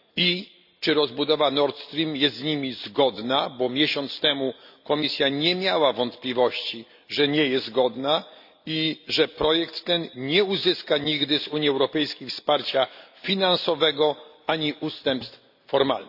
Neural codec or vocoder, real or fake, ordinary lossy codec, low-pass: none; real; AAC, 48 kbps; 5.4 kHz